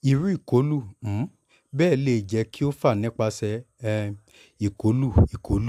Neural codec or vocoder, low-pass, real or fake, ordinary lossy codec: none; 14.4 kHz; real; AAC, 96 kbps